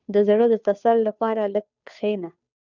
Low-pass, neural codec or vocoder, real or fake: 7.2 kHz; codec, 16 kHz, 2 kbps, FunCodec, trained on Chinese and English, 25 frames a second; fake